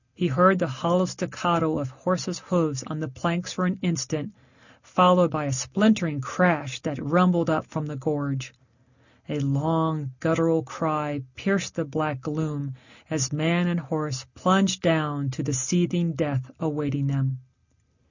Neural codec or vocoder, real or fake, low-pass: none; real; 7.2 kHz